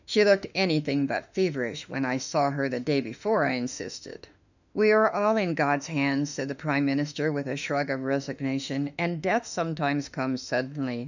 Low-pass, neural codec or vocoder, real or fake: 7.2 kHz; autoencoder, 48 kHz, 32 numbers a frame, DAC-VAE, trained on Japanese speech; fake